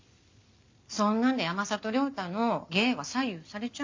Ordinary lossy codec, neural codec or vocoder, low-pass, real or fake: MP3, 48 kbps; none; 7.2 kHz; real